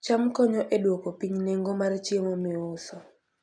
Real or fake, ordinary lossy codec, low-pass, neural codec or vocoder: real; none; 9.9 kHz; none